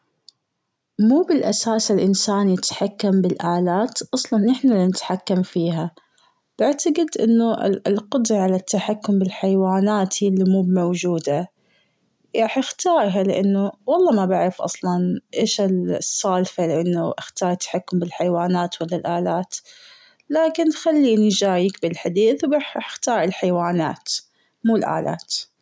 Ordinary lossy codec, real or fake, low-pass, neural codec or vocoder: none; real; none; none